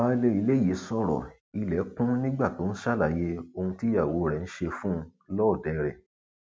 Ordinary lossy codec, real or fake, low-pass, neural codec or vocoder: none; real; none; none